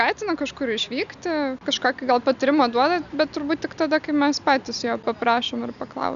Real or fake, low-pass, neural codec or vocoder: real; 7.2 kHz; none